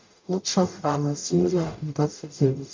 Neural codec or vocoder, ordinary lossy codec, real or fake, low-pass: codec, 44.1 kHz, 0.9 kbps, DAC; MP3, 48 kbps; fake; 7.2 kHz